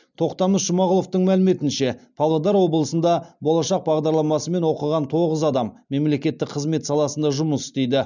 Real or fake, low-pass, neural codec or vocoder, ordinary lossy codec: real; 7.2 kHz; none; none